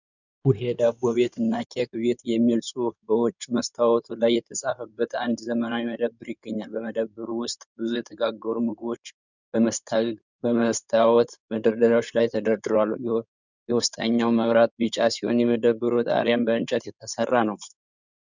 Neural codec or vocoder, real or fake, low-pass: codec, 16 kHz in and 24 kHz out, 2.2 kbps, FireRedTTS-2 codec; fake; 7.2 kHz